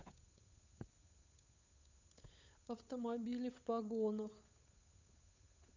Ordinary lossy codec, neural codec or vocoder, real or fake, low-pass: none; codec, 16 kHz, 8 kbps, FunCodec, trained on Chinese and English, 25 frames a second; fake; 7.2 kHz